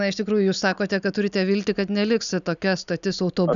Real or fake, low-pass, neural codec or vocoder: real; 7.2 kHz; none